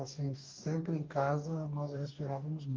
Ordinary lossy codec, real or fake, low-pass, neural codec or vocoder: Opus, 32 kbps; fake; 7.2 kHz; codec, 44.1 kHz, 2.6 kbps, DAC